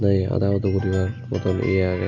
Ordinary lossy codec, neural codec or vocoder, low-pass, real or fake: none; none; 7.2 kHz; real